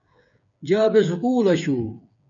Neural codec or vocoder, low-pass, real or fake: codec, 16 kHz, 8 kbps, FreqCodec, smaller model; 7.2 kHz; fake